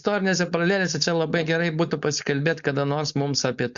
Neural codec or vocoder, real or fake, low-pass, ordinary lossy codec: codec, 16 kHz, 4.8 kbps, FACodec; fake; 7.2 kHz; Opus, 64 kbps